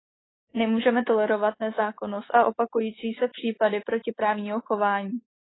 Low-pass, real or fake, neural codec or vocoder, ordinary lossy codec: 7.2 kHz; fake; codec, 24 kHz, 3.1 kbps, DualCodec; AAC, 16 kbps